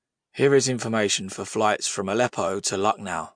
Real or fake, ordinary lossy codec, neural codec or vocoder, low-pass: real; MP3, 64 kbps; none; 9.9 kHz